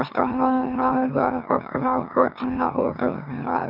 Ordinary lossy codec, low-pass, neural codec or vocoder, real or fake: none; 5.4 kHz; autoencoder, 44.1 kHz, a latent of 192 numbers a frame, MeloTTS; fake